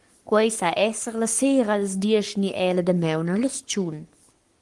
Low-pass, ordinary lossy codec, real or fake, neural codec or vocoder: 10.8 kHz; Opus, 24 kbps; fake; codec, 44.1 kHz, 7.8 kbps, Pupu-Codec